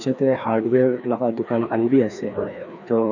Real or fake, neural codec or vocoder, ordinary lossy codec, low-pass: fake; codec, 16 kHz, 2 kbps, FreqCodec, larger model; none; 7.2 kHz